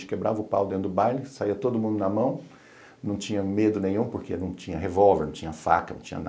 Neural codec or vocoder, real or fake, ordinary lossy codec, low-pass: none; real; none; none